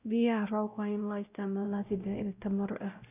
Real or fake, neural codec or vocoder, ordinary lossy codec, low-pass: fake; codec, 16 kHz, 0.5 kbps, X-Codec, WavLM features, trained on Multilingual LibriSpeech; none; 3.6 kHz